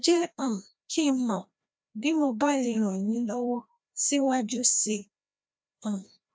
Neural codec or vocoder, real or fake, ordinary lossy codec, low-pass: codec, 16 kHz, 1 kbps, FreqCodec, larger model; fake; none; none